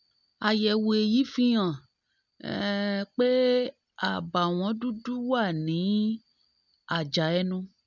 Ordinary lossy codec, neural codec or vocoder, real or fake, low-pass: none; none; real; 7.2 kHz